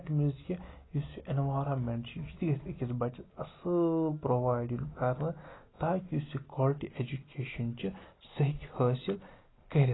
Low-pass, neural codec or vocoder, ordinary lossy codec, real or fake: 7.2 kHz; none; AAC, 16 kbps; real